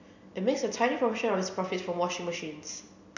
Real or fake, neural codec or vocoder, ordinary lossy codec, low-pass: real; none; none; 7.2 kHz